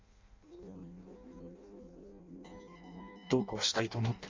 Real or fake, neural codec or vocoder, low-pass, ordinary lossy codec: fake; codec, 16 kHz in and 24 kHz out, 0.6 kbps, FireRedTTS-2 codec; 7.2 kHz; MP3, 64 kbps